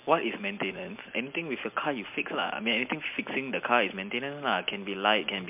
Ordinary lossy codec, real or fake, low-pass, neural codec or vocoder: MP3, 32 kbps; real; 3.6 kHz; none